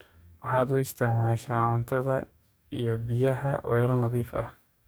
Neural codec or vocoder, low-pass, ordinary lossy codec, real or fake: codec, 44.1 kHz, 2.6 kbps, DAC; none; none; fake